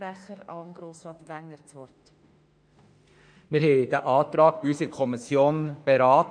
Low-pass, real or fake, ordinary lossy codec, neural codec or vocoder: 9.9 kHz; fake; none; autoencoder, 48 kHz, 32 numbers a frame, DAC-VAE, trained on Japanese speech